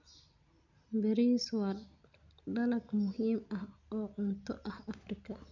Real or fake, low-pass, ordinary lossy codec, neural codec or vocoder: real; 7.2 kHz; none; none